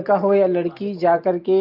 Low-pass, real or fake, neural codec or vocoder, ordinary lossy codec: 5.4 kHz; real; none; Opus, 32 kbps